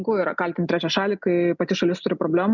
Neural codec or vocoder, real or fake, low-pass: none; real; 7.2 kHz